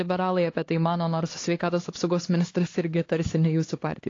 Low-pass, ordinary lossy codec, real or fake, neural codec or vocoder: 7.2 kHz; AAC, 32 kbps; fake; codec, 16 kHz, 2 kbps, X-Codec, HuBERT features, trained on LibriSpeech